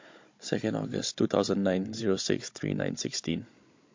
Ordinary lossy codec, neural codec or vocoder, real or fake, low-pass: MP3, 48 kbps; codec, 16 kHz, 16 kbps, FunCodec, trained on Chinese and English, 50 frames a second; fake; 7.2 kHz